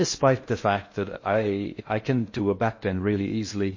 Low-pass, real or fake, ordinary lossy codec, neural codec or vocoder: 7.2 kHz; fake; MP3, 32 kbps; codec, 16 kHz in and 24 kHz out, 0.6 kbps, FocalCodec, streaming, 4096 codes